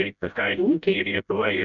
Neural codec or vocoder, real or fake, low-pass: codec, 16 kHz, 0.5 kbps, FreqCodec, smaller model; fake; 7.2 kHz